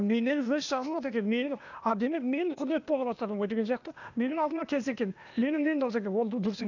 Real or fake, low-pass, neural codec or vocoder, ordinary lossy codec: fake; 7.2 kHz; codec, 16 kHz, 0.8 kbps, ZipCodec; none